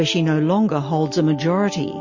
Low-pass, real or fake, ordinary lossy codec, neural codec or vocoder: 7.2 kHz; real; MP3, 32 kbps; none